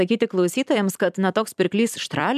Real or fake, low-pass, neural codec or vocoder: fake; 14.4 kHz; vocoder, 44.1 kHz, 128 mel bands every 256 samples, BigVGAN v2